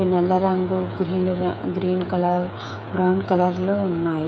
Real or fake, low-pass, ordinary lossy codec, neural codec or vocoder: fake; none; none; codec, 16 kHz, 8 kbps, FreqCodec, smaller model